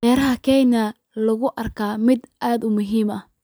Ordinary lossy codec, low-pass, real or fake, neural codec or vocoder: none; none; fake; vocoder, 44.1 kHz, 128 mel bands every 256 samples, BigVGAN v2